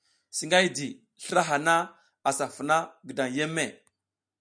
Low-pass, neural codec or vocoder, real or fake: 9.9 kHz; none; real